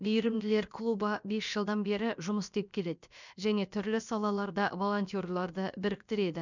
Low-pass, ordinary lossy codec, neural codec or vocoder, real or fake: 7.2 kHz; none; codec, 16 kHz, about 1 kbps, DyCAST, with the encoder's durations; fake